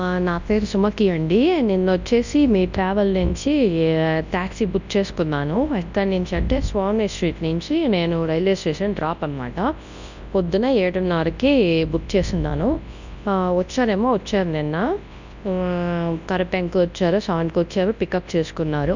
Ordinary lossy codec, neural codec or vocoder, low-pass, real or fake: none; codec, 24 kHz, 0.9 kbps, WavTokenizer, large speech release; 7.2 kHz; fake